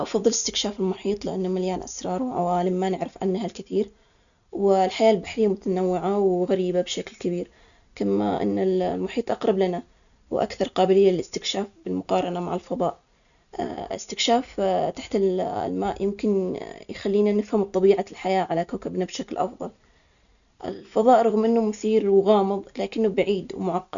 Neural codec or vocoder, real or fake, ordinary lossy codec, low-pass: none; real; none; 7.2 kHz